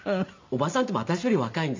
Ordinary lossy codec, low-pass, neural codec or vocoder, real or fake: MP3, 64 kbps; 7.2 kHz; none; real